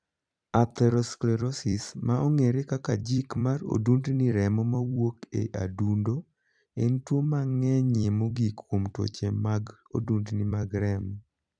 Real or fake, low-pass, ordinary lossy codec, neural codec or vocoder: fake; 9.9 kHz; none; vocoder, 44.1 kHz, 128 mel bands every 256 samples, BigVGAN v2